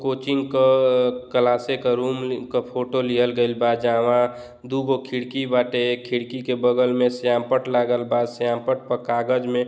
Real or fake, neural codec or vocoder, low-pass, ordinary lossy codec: real; none; none; none